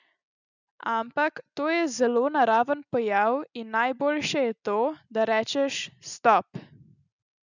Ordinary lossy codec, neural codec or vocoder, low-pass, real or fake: none; none; 7.2 kHz; real